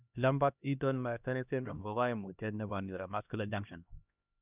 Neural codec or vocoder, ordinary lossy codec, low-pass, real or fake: codec, 16 kHz, 1 kbps, X-Codec, HuBERT features, trained on LibriSpeech; none; 3.6 kHz; fake